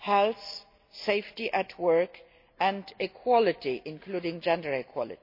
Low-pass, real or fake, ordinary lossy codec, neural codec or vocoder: 5.4 kHz; real; MP3, 32 kbps; none